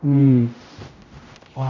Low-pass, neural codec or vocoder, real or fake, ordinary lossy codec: 7.2 kHz; codec, 16 kHz, 0.5 kbps, X-Codec, HuBERT features, trained on general audio; fake; none